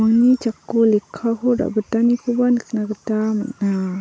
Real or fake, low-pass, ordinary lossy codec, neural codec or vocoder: real; none; none; none